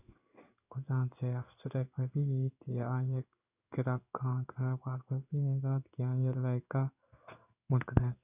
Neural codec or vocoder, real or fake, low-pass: codec, 16 kHz in and 24 kHz out, 1 kbps, XY-Tokenizer; fake; 3.6 kHz